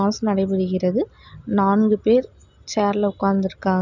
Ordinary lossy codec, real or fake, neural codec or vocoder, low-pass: none; real; none; 7.2 kHz